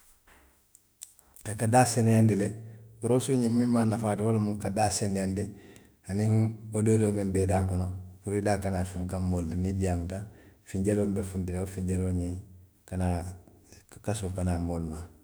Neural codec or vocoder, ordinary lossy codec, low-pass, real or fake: autoencoder, 48 kHz, 32 numbers a frame, DAC-VAE, trained on Japanese speech; none; none; fake